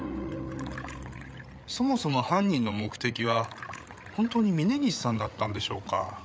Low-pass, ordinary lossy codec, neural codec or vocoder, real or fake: none; none; codec, 16 kHz, 8 kbps, FreqCodec, larger model; fake